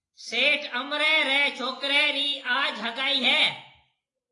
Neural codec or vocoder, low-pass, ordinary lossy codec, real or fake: none; 10.8 kHz; AAC, 32 kbps; real